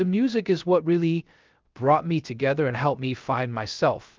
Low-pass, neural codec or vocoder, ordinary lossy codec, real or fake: 7.2 kHz; codec, 16 kHz, 0.3 kbps, FocalCodec; Opus, 24 kbps; fake